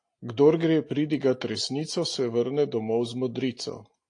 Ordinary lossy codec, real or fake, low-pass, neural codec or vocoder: AAC, 48 kbps; real; 9.9 kHz; none